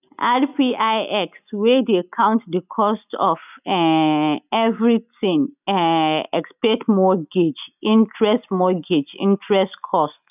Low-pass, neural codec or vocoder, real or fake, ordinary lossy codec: 3.6 kHz; none; real; none